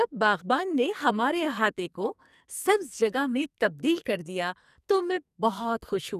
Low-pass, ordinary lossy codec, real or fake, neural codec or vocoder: 14.4 kHz; none; fake; codec, 44.1 kHz, 2.6 kbps, SNAC